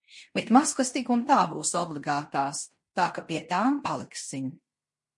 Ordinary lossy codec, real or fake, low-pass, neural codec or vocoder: MP3, 48 kbps; fake; 10.8 kHz; codec, 16 kHz in and 24 kHz out, 0.9 kbps, LongCat-Audio-Codec, fine tuned four codebook decoder